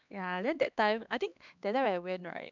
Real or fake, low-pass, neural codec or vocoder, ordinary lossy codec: fake; 7.2 kHz; codec, 16 kHz, 2 kbps, X-Codec, HuBERT features, trained on LibriSpeech; none